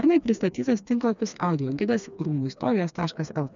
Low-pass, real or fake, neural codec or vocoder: 7.2 kHz; fake; codec, 16 kHz, 2 kbps, FreqCodec, smaller model